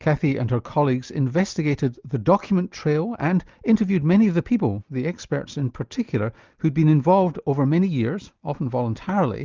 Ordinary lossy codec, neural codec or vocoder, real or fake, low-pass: Opus, 24 kbps; none; real; 7.2 kHz